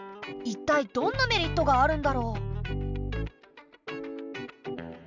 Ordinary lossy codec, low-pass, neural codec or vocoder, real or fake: none; 7.2 kHz; none; real